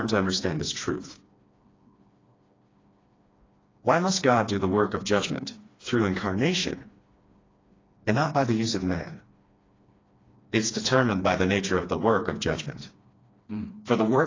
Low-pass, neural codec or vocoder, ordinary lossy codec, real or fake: 7.2 kHz; codec, 16 kHz, 2 kbps, FreqCodec, smaller model; AAC, 32 kbps; fake